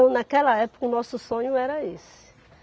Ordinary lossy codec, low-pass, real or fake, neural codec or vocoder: none; none; real; none